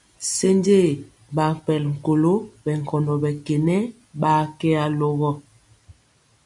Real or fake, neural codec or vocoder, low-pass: real; none; 10.8 kHz